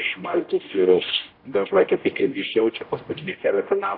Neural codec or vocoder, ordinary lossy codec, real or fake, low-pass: codec, 16 kHz, 0.5 kbps, X-Codec, HuBERT features, trained on general audio; AAC, 32 kbps; fake; 5.4 kHz